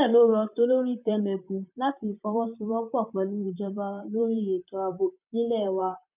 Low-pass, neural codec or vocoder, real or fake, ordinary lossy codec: 3.6 kHz; vocoder, 44.1 kHz, 128 mel bands, Pupu-Vocoder; fake; none